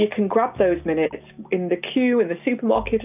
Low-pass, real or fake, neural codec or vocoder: 3.6 kHz; real; none